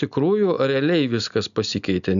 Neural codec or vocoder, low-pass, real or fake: none; 7.2 kHz; real